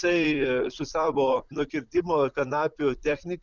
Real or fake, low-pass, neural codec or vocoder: fake; 7.2 kHz; vocoder, 44.1 kHz, 128 mel bands, Pupu-Vocoder